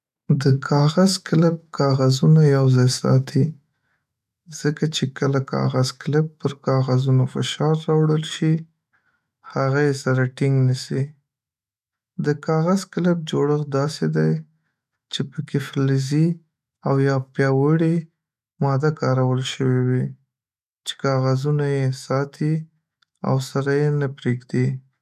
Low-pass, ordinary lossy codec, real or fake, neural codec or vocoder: 14.4 kHz; none; fake; autoencoder, 48 kHz, 128 numbers a frame, DAC-VAE, trained on Japanese speech